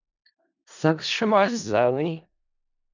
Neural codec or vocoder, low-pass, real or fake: codec, 16 kHz in and 24 kHz out, 0.4 kbps, LongCat-Audio-Codec, four codebook decoder; 7.2 kHz; fake